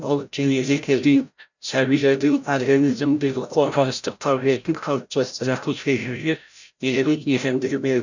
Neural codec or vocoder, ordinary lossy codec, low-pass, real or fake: codec, 16 kHz, 0.5 kbps, FreqCodec, larger model; none; 7.2 kHz; fake